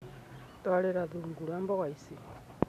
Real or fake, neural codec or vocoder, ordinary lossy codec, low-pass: real; none; none; 14.4 kHz